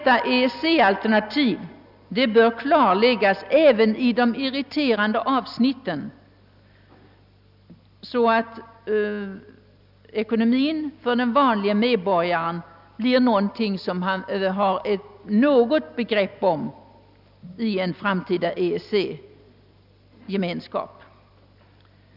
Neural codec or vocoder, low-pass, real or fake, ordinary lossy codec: none; 5.4 kHz; real; none